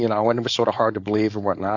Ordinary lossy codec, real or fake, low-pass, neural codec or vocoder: AAC, 48 kbps; fake; 7.2 kHz; codec, 16 kHz, 4.8 kbps, FACodec